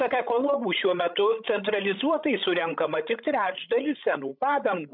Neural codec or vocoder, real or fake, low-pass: codec, 16 kHz, 16 kbps, FreqCodec, larger model; fake; 5.4 kHz